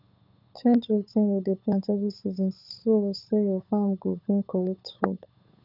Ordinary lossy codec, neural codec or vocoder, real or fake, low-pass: none; codec, 16 kHz, 8 kbps, FunCodec, trained on Chinese and English, 25 frames a second; fake; 5.4 kHz